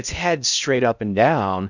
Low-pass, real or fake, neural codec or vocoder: 7.2 kHz; fake; codec, 16 kHz in and 24 kHz out, 0.6 kbps, FocalCodec, streaming, 2048 codes